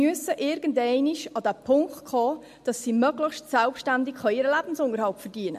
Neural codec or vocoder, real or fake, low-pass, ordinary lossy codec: none; real; 14.4 kHz; MP3, 64 kbps